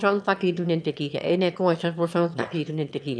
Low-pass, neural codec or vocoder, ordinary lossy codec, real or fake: none; autoencoder, 22.05 kHz, a latent of 192 numbers a frame, VITS, trained on one speaker; none; fake